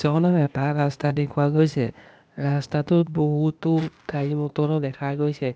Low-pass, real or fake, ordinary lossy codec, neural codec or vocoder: none; fake; none; codec, 16 kHz, 0.8 kbps, ZipCodec